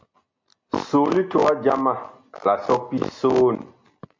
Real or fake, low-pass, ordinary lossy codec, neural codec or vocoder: real; 7.2 kHz; MP3, 64 kbps; none